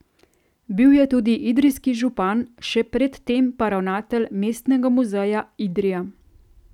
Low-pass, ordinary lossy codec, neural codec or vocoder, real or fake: 19.8 kHz; none; none; real